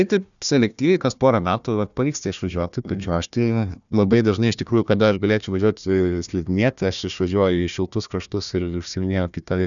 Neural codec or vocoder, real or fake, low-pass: codec, 16 kHz, 1 kbps, FunCodec, trained on Chinese and English, 50 frames a second; fake; 7.2 kHz